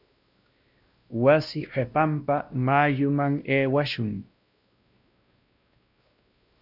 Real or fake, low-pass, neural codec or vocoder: fake; 5.4 kHz; codec, 16 kHz, 1 kbps, X-Codec, WavLM features, trained on Multilingual LibriSpeech